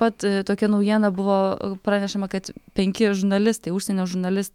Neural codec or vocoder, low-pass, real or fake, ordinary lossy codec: autoencoder, 48 kHz, 128 numbers a frame, DAC-VAE, trained on Japanese speech; 19.8 kHz; fake; MP3, 96 kbps